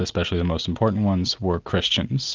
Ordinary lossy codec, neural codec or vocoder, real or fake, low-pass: Opus, 32 kbps; none; real; 7.2 kHz